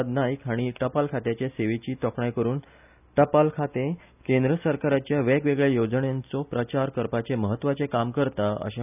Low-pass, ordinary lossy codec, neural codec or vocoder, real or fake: 3.6 kHz; none; none; real